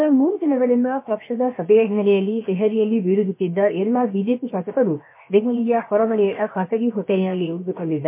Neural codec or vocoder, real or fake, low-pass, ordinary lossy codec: codec, 16 kHz, 0.7 kbps, FocalCodec; fake; 3.6 kHz; MP3, 24 kbps